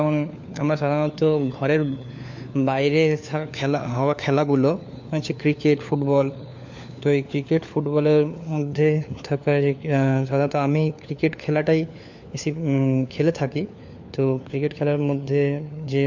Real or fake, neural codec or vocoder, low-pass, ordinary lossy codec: fake; codec, 16 kHz, 4 kbps, FunCodec, trained on LibriTTS, 50 frames a second; 7.2 kHz; MP3, 48 kbps